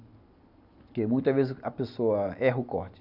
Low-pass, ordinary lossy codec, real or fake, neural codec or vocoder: 5.4 kHz; none; real; none